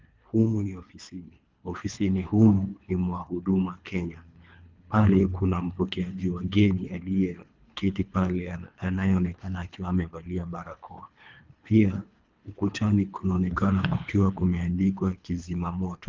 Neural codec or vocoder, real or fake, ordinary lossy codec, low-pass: codec, 24 kHz, 3 kbps, HILCodec; fake; Opus, 24 kbps; 7.2 kHz